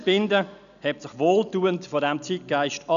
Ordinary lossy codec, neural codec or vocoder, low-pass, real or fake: none; none; 7.2 kHz; real